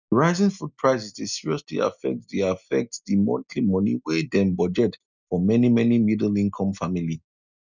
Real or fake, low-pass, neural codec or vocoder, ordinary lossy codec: real; 7.2 kHz; none; none